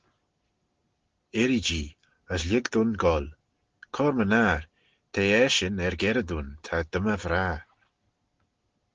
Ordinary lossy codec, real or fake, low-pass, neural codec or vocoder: Opus, 16 kbps; real; 7.2 kHz; none